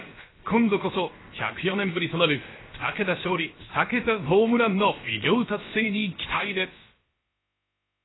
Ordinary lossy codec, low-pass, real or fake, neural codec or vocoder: AAC, 16 kbps; 7.2 kHz; fake; codec, 16 kHz, about 1 kbps, DyCAST, with the encoder's durations